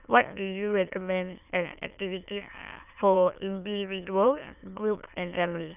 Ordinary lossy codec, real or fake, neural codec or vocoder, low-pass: none; fake; autoencoder, 22.05 kHz, a latent of 192 numbers a frame, VITS, trained on many speakers; 3.6 kHz